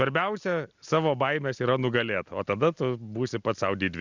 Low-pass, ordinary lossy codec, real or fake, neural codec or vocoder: 7.2 kHz; Opus, 64 kbps; real; none